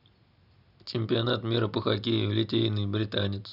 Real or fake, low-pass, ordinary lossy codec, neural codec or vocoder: real; 5.4 kHz; none; none